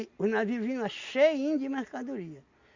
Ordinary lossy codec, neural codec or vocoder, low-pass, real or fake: Opus, 64 kbps; none; 7.2 kHz; real